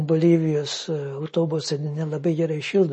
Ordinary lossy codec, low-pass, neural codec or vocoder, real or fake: MP3, 32 kbps; 10.8 kHz; none; real